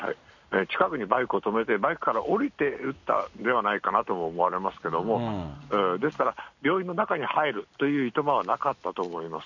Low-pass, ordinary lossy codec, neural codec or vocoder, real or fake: 7.2 kHz; none; none; real